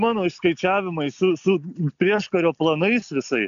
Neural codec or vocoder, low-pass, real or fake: none; 7.2 kHz; real